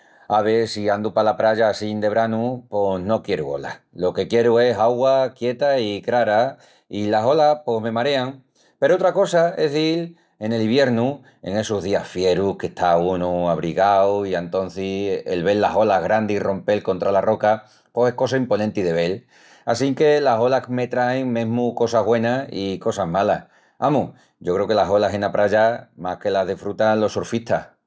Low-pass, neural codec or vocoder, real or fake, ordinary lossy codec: none; none; real; none